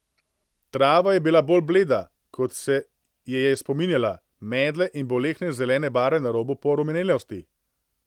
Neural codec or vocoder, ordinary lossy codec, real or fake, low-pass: none; Opus, 24 kbps; real; 19.8 kHz